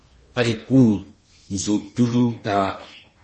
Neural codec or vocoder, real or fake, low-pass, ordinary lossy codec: codec, 16 kHz in and 24 kHz out, 0.8 kbps, FocalCodec, streaming, 65536 codes; fake; 10.8 kHz; MP3, 32 kbps